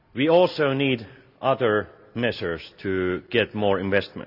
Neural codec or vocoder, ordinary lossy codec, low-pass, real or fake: none; none; 5.4 kHz; real